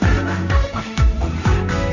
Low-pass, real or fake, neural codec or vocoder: 7.2 kHz; fake; codec, 16 kHz in and 24 kHz out, 0.9 kbps, LongCat-Audio-Codec, four codebook decoder